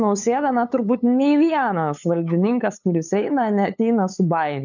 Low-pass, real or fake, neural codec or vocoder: 7.2 kHz; fake; codec, 16 kHz, 8 kbps, FunCodec, trained on LibriTTS, 25 frames a second